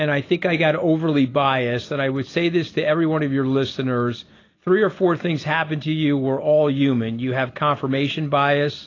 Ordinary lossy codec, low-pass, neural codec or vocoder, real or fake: AAC, 32 kbps; 7.2 kHz; none; real